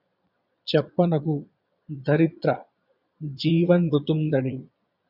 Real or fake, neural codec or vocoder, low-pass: fake; vocoder, 22.05 kHz, 80 mel bands, Vocos; 5.4 kHz